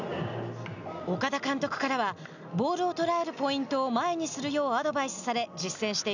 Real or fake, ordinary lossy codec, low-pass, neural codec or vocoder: real; none; 7.2 kHz; none